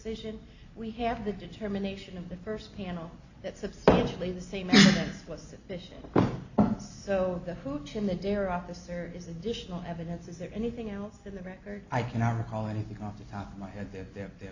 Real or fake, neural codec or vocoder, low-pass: real; none; 7.2 kHz